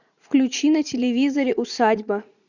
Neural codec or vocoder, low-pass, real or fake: vocoder, 44.1 kHz, 80 mel bands, Vocos; 7.2 kHz; fake